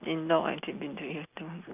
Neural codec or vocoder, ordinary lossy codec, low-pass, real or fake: none; none; 3.6 kHz; real